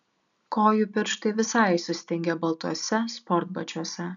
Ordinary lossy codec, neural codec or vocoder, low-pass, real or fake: MP3, 96 kbps; none; 7.2 kHz; real